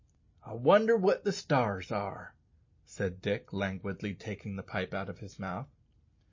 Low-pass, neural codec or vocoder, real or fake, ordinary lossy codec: 7.2 kHz; none; real; MP3, 32 kbps